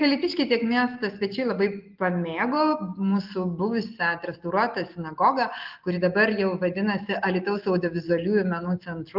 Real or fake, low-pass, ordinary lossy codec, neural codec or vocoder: real; 5.4 kHz; Opus, 24 kbps; none